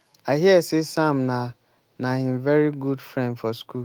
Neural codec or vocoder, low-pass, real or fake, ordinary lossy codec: autoencoder, 48 kHz, 128 numbers a frame, DAC-VAE, trained on Japanese speech; 19.8 kHz; fake; Opus, 24 kbps